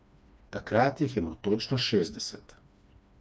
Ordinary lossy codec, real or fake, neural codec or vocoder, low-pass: none; fake; codec, 16 kHz, 2 kbps, FreqCodec, smaller model; none